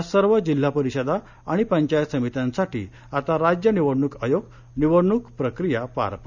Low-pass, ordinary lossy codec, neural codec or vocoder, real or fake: 7.2 kHz; none; none; real